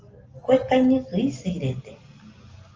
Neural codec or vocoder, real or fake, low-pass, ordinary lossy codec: none; real; 7.2 kHz; Opus, 24 kbps